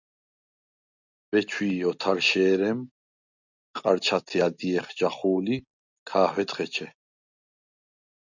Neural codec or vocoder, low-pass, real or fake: none; 7.2 kHz; real